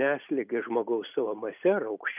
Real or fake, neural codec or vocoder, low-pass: real; none; 3.6 kHz